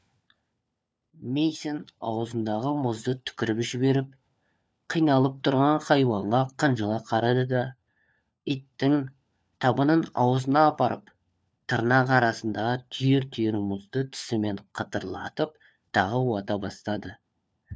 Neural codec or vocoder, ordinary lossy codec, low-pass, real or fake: codec, 16 kHz, 4 kbps, FunCodec, trained on LibriTTS, 50 frames a second; none; none; fake